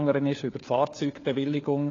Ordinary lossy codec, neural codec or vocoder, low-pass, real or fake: AAC, 32 kbps; codec, 16 kHz, 4 kbps, FunCodec, trained on LibriTTS, 50 frames a second; 7.2 kHz; fake